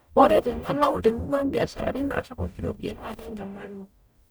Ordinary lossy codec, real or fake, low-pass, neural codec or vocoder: none; fake; none; codec, 44.1 kHz, 0.9 kbps, DAC